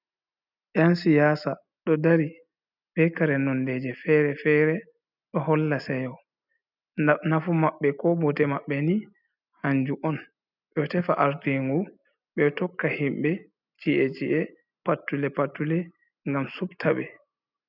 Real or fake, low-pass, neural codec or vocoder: real; 5.4 kHz; none